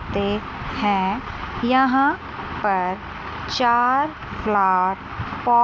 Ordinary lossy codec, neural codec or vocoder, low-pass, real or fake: none; none; none; real